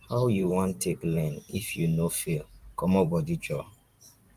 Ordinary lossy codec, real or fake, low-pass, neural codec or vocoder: Opus, 32 kbps; fake; 14.4 kHz; vocoder, 44.1 kHz, 128 mel bands every 512 samples, BigVGAN v2